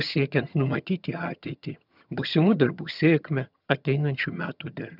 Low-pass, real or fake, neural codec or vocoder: 5.4 kHz; fake; vocoder, 22.05 kHz, 80 mel bands, HiFi-GAN